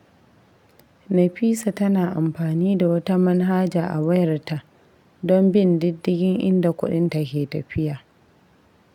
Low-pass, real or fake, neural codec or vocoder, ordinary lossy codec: 19.8 kHz; real; none; none